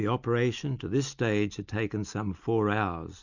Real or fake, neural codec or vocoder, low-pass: real; none; 7.2 kHz